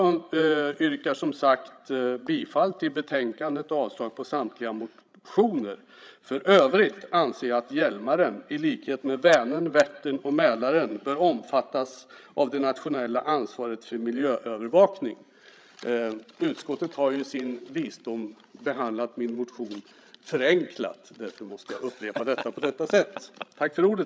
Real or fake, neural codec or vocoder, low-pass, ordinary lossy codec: fake; codec, 16 kHz, 16 kbps, FreqCodec, larger model; none; none